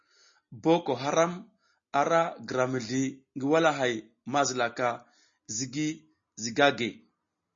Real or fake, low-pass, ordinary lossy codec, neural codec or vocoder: real; 7.2 kHz; MP3, 32 kbps; none